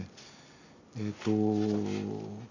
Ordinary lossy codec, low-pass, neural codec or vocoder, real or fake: none; 7.2 kHz; none; real